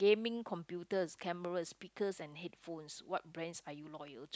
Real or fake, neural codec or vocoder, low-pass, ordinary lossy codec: real; none; none; none